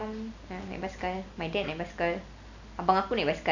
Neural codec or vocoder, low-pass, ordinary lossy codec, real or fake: none; 7.2 kHz; none; real